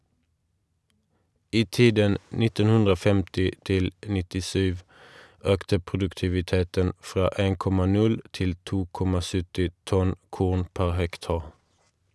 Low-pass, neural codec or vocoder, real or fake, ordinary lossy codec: none; none; real; none